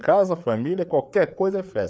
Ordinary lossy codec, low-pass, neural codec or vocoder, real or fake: none; none; codec, 16 kHz, 4 kbps, FreqCodec, larger model; fake